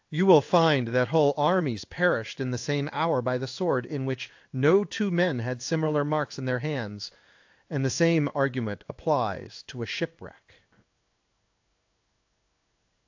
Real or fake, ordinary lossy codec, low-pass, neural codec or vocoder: fake; AAC, 48 kbps; 7.2 kHz; codec, 16 kHz in and 24 kHz out, 1 kbps, XY-Tokenizer